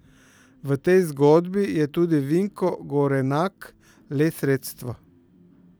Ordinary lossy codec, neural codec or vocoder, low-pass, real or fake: none; vocoder, 44.1 kHz, 128 mel bands every 256 samples, BigVGAN v2; none; fake